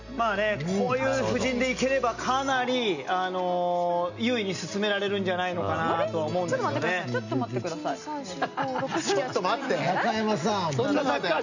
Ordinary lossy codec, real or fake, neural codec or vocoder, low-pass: none; real; none; 7.2 kHz